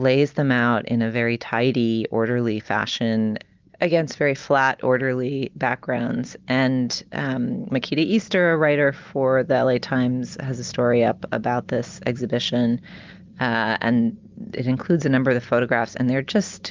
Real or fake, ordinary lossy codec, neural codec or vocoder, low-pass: real; Opus, 24 kbps; none; 7.2 kHz